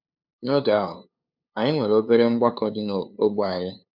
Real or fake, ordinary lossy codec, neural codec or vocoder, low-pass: fake; none; codec, 16 kHz, 2 kbps, FunCodec, trained on LibriTTS, 25 frames a second; 5.4 kHz